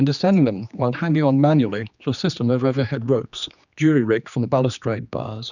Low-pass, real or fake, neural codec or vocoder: 7.2 kHz; fake; codec, 16 kHz, 2 kbps, X-Codec, HuBERT features, trained on general audio